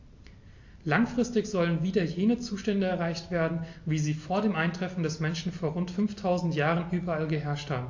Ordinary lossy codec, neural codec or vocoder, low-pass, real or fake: AAC, 48 kbps; none; 7.2 kHz; real